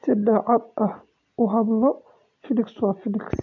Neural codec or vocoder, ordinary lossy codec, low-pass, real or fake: none; MP3, 48 kbps; 7.2 kHz; real